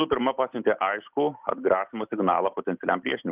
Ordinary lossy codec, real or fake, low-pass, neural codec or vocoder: Opus, 24 kbps; real; 3.6 kHz; none